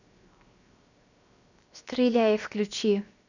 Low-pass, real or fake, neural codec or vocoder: 7.2 kHz; fake; codec, 16 kHz, 0.7 kbps, FocalCodec